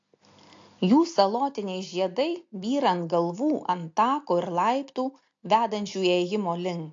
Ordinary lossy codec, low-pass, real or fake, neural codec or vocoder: AAC, 48 kbps; 7.2 kHz; real; none